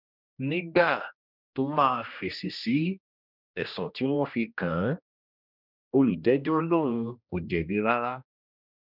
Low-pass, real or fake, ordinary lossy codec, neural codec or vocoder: 5.4 kHz; fake; none; codec, 16 kHz, 1 kbps, X-Codec, HuBERT features, trained on general audio